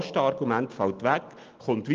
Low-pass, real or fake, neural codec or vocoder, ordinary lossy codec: 7.2 kHz; real; none; Opus, 32 kbps